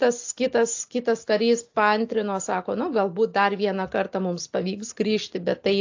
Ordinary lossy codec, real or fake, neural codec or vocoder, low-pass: AAC, 48 kbps; real; none; 7.2 kHz